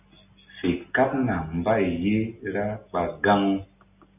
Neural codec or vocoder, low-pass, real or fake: none; 3.6 kHz; real